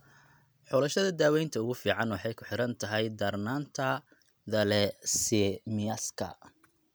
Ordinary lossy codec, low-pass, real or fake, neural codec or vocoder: none; none; real; none